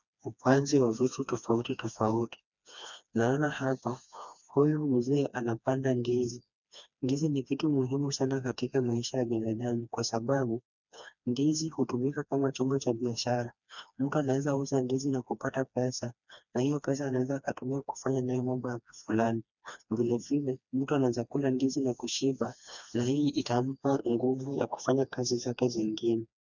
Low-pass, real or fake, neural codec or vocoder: 7.2 kHz; fake; codec, 16 kHz, 2 kbps, FreqCodec, smaller model